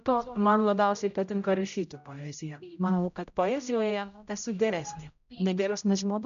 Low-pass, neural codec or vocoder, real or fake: 7.2 kHz; codec, 16 kHz, 0.5 kbps, X-Codec, HuBERT features, trained on general audio; fake